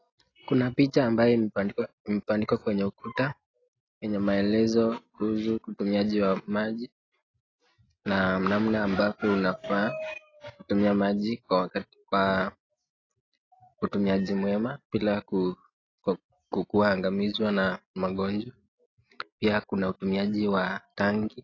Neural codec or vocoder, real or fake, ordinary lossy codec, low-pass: none; real; AAC, 32 kbps; 7.2 kHz